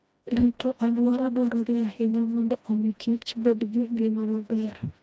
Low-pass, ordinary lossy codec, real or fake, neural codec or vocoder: none; none; fake; codec, 16 kHz, 1 kbps, FreqCodec, smaller model